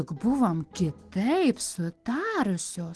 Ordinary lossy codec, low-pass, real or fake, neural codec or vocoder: Opus, 16 kbps; 10.8 kHz; real; none